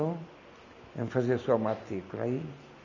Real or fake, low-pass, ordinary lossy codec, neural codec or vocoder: real; 7.2 kHz; none; none